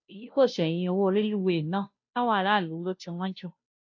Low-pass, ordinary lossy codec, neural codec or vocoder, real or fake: 7.2 kHz; AAC, 48 kbps; codec, 16 kHz, 0.5 kbps, FunCodec, trained on Chinese and English, 25 frames a second; fake